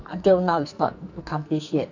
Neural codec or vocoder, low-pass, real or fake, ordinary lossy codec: codec, 44.1 kHz, 2.6 kbps, SNAC; 7.2 kHz; fake; none